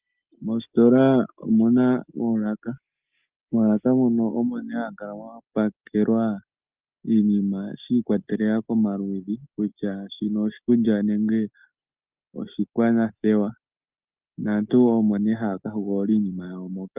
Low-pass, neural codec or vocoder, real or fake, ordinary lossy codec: 3.6 kHz; none; real; Opus, 24 kbps